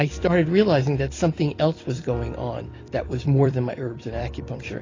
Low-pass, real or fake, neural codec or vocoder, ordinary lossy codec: 7.2 kHz; fake; vocoder, 44.1 kHz, 128 mel bands every 256 samples, BigVGAN v2; AAC, 32 kbps